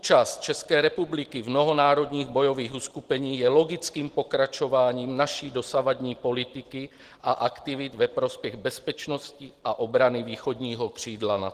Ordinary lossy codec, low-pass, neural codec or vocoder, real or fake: Opus, 16 kbps; 14.4 kHz; none; real